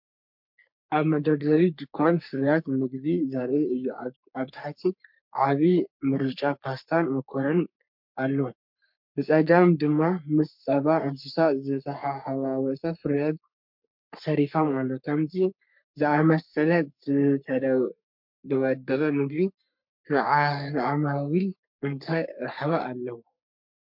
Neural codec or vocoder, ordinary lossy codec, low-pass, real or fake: codec, 44.1 kHz, 3.4 kbps, Pupu-Codec; MP3, 48 kbps; 5.4 kHz; fake